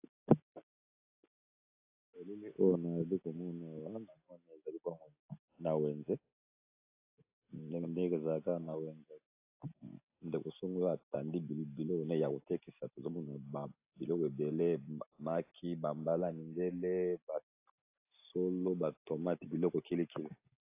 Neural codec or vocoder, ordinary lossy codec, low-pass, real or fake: none; AAC, 24 kbps; 3.6 kHz; real